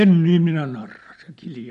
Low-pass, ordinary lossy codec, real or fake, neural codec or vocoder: 14.4 kHz; MP3, 48 kbps; real; none